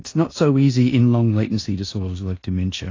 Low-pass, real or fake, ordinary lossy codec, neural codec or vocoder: 7.2 kHz; fake; AAC, 32 kbps; codec, 16 kHz in and 24 kHz out, 0.9 kbps, LongCat-Audio-Codec, four codebook decoder